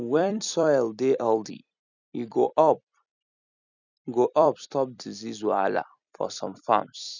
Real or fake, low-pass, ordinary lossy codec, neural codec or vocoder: fake; 7.2 kHz; none; vocoder, 44.1 kHz, 128 mel bands every 256 samples, BigVGAN v2